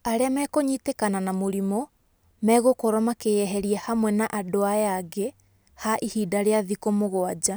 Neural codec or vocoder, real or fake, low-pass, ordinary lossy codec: none; real; none; none